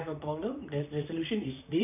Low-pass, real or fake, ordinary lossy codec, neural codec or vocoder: 3.6 kHz; fake; none; vocoder, 44.1 kHz, 128 mel bands, Pupu-Vocoder